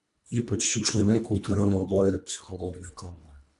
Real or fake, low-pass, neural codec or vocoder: fake; 10.8 kHz; codec, 24 kHz, 1.5 kbps, HILCodec